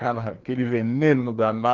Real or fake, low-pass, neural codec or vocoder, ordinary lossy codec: fake; 7.2 kHz; codec, 16 kHz, 2 kbps, FreqCodec, larger model; Opus, 32 kbps